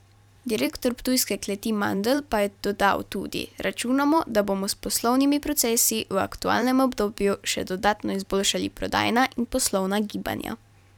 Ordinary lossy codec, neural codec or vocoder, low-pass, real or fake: none; vocoder, 44.1 kHz, 128 mel bands every 512 samples, BigVGAN v2; 19.8 kHz; fake